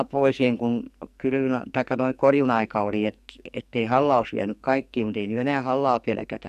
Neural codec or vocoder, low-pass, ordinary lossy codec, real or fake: codec, 44.1 kHz, 2.6 kbps, SNAC; 14.4 kHz; MP3, 96 kbps; fake